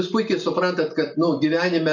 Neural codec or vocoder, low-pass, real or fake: none; 7.2 kHz; real